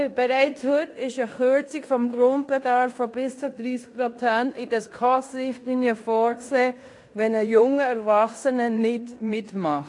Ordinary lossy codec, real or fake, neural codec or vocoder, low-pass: AAC, 48 kbps; fake; codec, 16 kHz in and 24 kHz out, 0.9 kbps, LongCat-Audio-Codec, fine tuned four codebook decoder; 10.8 kHz